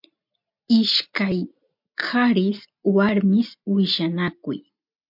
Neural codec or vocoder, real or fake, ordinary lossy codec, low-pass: none; real; MP3, 48 kbps; 5.4 kHz